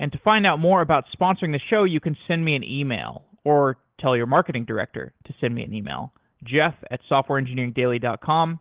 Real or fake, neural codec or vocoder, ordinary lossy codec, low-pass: real; none; Opus, 16 kbps; 3.6 kHz